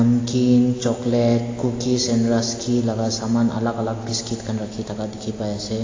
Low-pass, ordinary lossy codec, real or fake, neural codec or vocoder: 7.2 kHz; AAC, 32 kbps; real; none